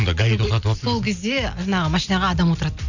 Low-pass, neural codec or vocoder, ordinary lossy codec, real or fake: 7.2 kHz; none; none; real